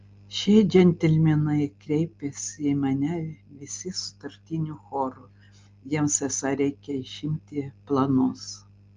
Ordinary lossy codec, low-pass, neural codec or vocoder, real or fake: Opus, 32 kbps; 7.2 kHz; none; real